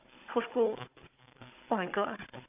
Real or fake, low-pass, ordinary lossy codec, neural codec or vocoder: fake; 3.6 kHz; AAC, 32 kbps; codec, 16 kHz, 16 kbps, FunCodec, trained on Chinese and English, 50 frames a second